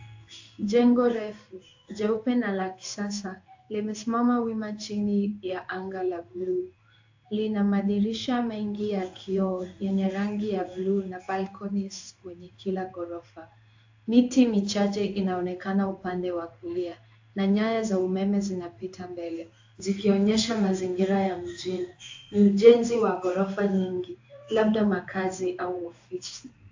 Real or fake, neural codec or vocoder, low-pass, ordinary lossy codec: fake; codec, 16 kHz in and 24 kHz out, 1 kbps, XY-Tokenizer; 7.2 kHz; AAC, 48 kbps